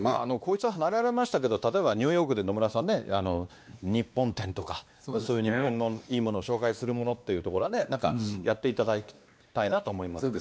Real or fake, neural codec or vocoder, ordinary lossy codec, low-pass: fake; codec, 16 kHz, 2 kbps, X-Codec, WavLM features, trained on Multilingual LibriSpeech; none; none